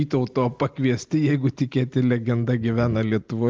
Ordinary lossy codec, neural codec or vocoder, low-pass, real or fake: Opus, 32 kbps; none; 7.2 kHz; real